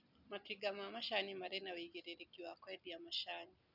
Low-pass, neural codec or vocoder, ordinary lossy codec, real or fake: 5.4 kHz; none; none; real